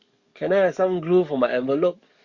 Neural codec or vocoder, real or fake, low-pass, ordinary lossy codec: codec, 44.1 kHz, 7.8 kbps, DAC; fake; 7.2 kHz; Opus, 64 kbps